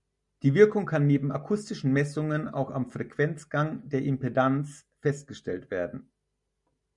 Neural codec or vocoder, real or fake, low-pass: none; real; 10.8 kHz